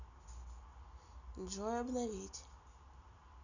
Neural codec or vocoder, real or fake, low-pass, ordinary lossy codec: none; real; 7.2 kHz; none